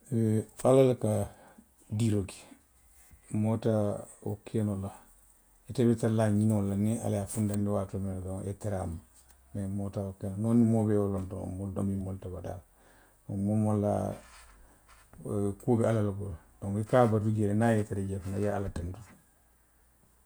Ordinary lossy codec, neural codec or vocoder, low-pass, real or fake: none; vocoder, 48 kHz, 128 mel bands, Vocos; none; fake